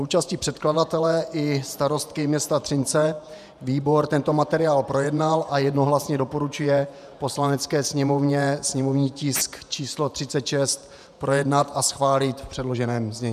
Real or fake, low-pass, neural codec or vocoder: fake; 14.4 kHz; vocoder, 48 kHz, 128 mel bands, Vocos